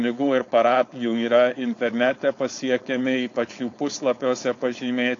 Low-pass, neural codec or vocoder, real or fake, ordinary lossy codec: 7.2 kHz; codec, 16 kHz, 4.8 kbps, FACodec; fake; AAC, 48 kbps